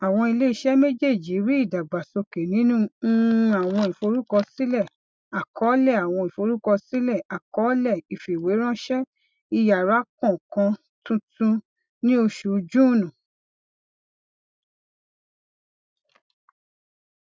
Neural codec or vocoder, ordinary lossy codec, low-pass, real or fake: none; none; none; real